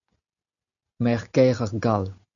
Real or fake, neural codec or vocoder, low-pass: real; none; 7.2 kHz